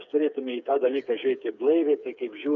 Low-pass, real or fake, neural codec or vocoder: 7.2 kHz; fake; codec, 16 kHz, 4 kbps, FreqCodec, smaller model